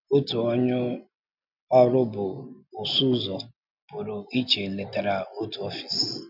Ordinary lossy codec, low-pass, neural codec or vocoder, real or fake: none; 5.4 kHz; none; real